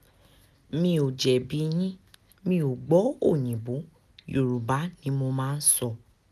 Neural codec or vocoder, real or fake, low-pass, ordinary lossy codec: none; real; 14.4 kHz; none